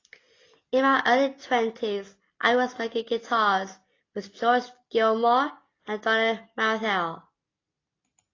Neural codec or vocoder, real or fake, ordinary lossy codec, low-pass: none; real; AAC, 32 kbps; 7.2 kHz